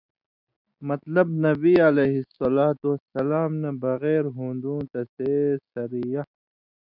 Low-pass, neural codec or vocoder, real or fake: 5.4 kHz; none; real